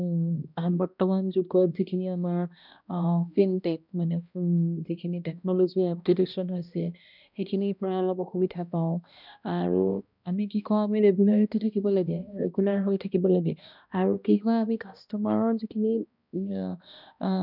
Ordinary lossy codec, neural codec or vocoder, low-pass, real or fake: none; codec, 16 kHz, 1 kbps, X-Codec, HuBERT features, trained on balanced general audio; 5.4 kHz; fake